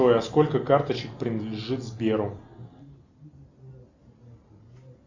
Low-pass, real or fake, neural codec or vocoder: 7.2 kHz; real; none